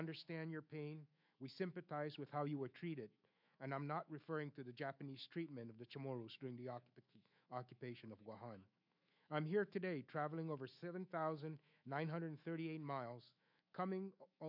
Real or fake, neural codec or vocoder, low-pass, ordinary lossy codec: fake; autoencoder, 48 kHz, 128 numbers a frame, DAC-VAE, trained on Japanese speech; 5.4 kHz; MP3, 48 kbps